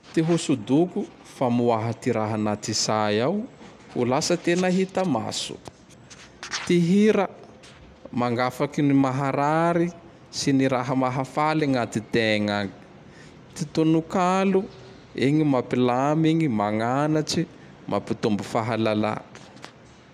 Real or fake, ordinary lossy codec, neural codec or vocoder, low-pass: real; none; none; 14.4 kHz